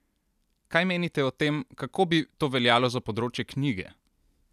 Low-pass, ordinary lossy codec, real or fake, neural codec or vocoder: 14.4 kHz; none; real; none